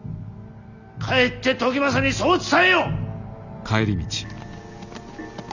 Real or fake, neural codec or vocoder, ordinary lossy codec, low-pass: real; none; none; 7.2 kHz